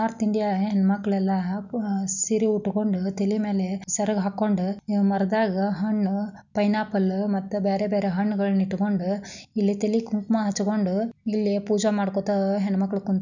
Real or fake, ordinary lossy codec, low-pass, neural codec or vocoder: real; none; 7.2 kHz; none